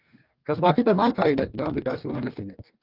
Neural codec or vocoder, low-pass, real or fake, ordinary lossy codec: codec, 44.1 kHz, 2.6 kbps, DAC; 5.4 kHz; fake; Opus, 32 kbps